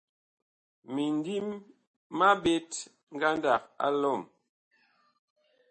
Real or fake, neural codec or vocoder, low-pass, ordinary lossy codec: real; none; 10.8 kHz; MP3, 32 kbps